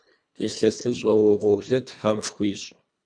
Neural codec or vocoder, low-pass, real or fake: codec, 24 kHz, 1.5 kbps, HILCodec; 9.9 kHz; fake